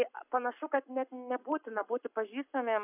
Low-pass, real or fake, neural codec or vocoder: 3.6 kHz; fake; codec, 44.1 kHz, 7.8 kbps, Pupu-Codec